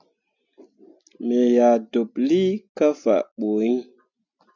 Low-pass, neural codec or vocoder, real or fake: 7.2 kHz; none; real